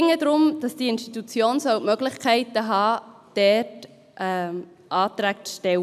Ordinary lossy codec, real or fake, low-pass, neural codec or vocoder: none; real; 14.4 kHz; none